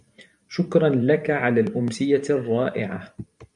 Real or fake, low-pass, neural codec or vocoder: fake; 10.8 kHz; vocoder, 44.1 kHz, 128 mel bands every 512 samples, BigVGAN v2